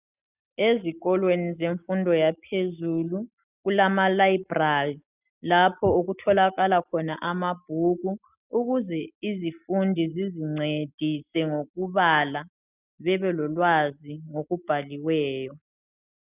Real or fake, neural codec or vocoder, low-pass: real; none; 3.6 kHz